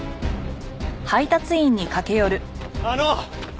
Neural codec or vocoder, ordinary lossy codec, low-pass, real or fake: none; none; none; real